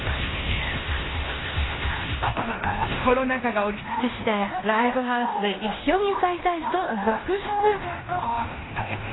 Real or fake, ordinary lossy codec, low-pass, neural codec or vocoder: fake; AAC, 16 kbps; 7.2 kHz; codec, 16 kHz in and 24 kHz out, 0.9 kbps, LongCat-Audio-Codec, fine tuned four codebook decoder